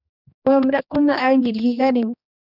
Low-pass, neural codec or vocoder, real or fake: 5.4 kHz; codec, 16 kHz, 2 kbps, X-Codec, HuBERT features, trained on general audio; fake